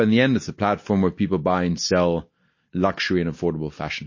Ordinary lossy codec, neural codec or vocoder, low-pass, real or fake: MP3, 32 kbps; none; 7.2 kHz; real